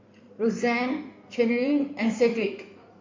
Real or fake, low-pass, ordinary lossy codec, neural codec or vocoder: fake; 7.2 kHz; MP3, 48 kbps; codec, 16 kHz in and 24 kHz out, 2.2 kbps, FireRedTTS-2 codec